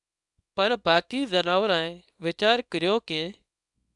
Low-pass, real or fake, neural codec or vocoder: 10.8 kHz; fake; codec, 24 kHz, 0.9 kbps, WavTokenizer, small release